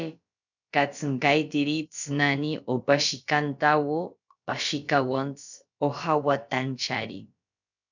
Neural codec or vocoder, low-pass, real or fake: codec, 16 kHz, about 1 kbps, DyCAST, with the encoder's durations; 7.2 kHz; fake